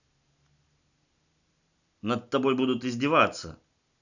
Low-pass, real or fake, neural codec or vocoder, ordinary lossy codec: 7.2 kHz; real; none; none